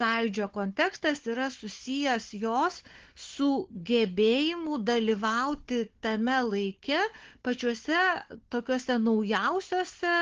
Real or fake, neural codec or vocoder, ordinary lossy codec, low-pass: fake; codec, 16 kHz, 4 kbps, FunCodec, trained on Chinese and English, 50 frames a second; Opus, 16 kbps; 7.2 kHz